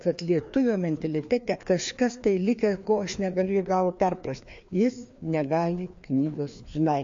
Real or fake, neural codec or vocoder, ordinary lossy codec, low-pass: fake; codec, 16 kHz, 2 kbps, FreqCodec, larger model; MP3, 48 kbps; 7.2 kHz